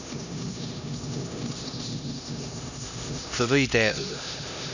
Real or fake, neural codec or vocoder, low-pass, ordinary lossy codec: fake; codec, 16 kHz, 1 kbps, X-Codec, HuBERT features, trained on LibriSpeech; 7.2 kHz; none